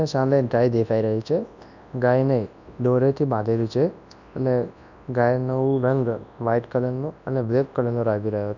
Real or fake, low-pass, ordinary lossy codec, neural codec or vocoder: fake; 7.2 kHz; none; codec, 24 kHz, 0.9 kbps, WavTokenizer, large speech release